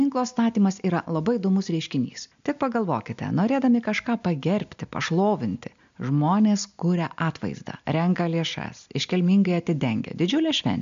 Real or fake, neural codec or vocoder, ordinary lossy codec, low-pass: real; none; MP3, 64 kbps; 7.2 kHz